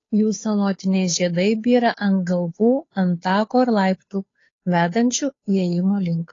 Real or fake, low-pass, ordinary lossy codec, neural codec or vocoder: fake; 7.2 kHz; AAC, 32 kbps; codec, 16 kHz, 2 kbps, FunCodec, trained on Chinese and English, 25 frames a second